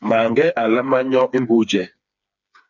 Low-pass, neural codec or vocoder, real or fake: 7.2 kHz; codec, 16 kHz, 4 kbps, FreqCodec, smaller model; fake